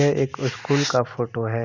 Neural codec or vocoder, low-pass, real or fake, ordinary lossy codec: none; 7.2 kHz; real; none